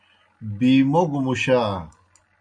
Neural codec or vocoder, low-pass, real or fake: none; 9.9 kHz; real